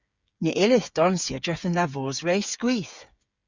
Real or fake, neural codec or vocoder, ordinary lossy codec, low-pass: fake; codec, 16 kHz, 8 kbps, FreqCodec, smaller model; Opus, 64 kbps; 7.2 kHz